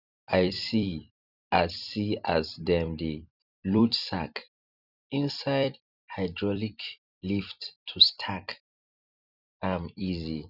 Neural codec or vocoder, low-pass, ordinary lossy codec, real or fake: vocoder, 44.1 kHz, 128 mel bands every 256 samples, BigVGAN v2; 5.4 kHz; none; fake